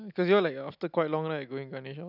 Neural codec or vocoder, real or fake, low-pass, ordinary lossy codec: none; real; 5.4 kHz; none